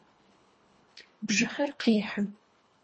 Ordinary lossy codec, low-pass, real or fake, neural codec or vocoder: MP3, 32 kbps; 9.9 kHz; fake; codec, 24 kHz, 1.5 kbps, HILCodec